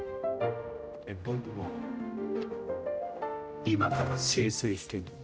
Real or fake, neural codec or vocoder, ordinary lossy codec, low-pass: fake; codec, 16 kHz, 0.5 kbps, X-Codec, HuBERT features, trained on general audio; none; none